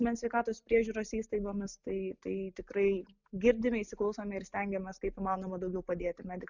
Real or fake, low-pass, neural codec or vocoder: real; 7.2 kHz; none